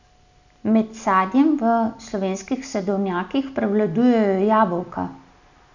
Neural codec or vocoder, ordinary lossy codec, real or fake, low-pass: none; none; real; 7.2 kHz